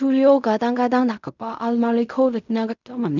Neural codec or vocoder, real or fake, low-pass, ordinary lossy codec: codec, 16 kHz in and 24 kHz out, 0.4 kbps, LongCat-Audio-Codec, fine tuned four codebook decoder; fake; 7.2 kHz; none